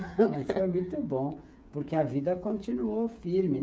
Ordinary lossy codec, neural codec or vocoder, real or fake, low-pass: none; codec, 16 kHz, 8 kbps, FreqCodec, smaller model; fake; none